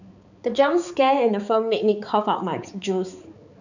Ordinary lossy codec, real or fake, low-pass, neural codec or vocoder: none; fake; 7.2 kHz; codec, 16 kHz, 4 kbps, X-Codec, HuBERT features, trained on balanced general audio